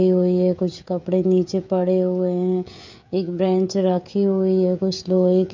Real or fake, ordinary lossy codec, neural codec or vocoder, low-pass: fake; MP3, 64 kbps; codec, 16 kHz, 16 kbps, FreqCodec, smaller model; 7.2 kHz